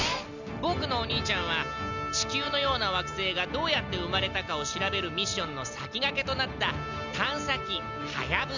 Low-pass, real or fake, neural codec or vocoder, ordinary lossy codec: 7.2 kHz; real; none; Opus, 64 kbps